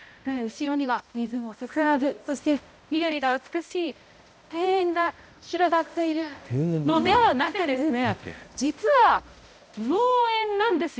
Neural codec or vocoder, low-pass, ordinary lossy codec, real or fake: codec, 16 kHz, 0.5 kbps, X-Codec, HuBERT features, trained on balanced general audio; none; none; fake